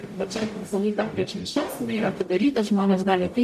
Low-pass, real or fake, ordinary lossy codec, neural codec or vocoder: 14.4 kHz; fake; MP3, 64 kbps; codec, 44.1 kHz, 0.9 kbps, DAC